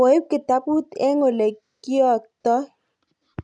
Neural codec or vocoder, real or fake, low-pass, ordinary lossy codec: none; real; none; none